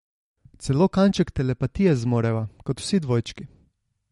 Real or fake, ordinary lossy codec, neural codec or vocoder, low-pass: real; MP3, 48 kbps; none; 19.8 kHz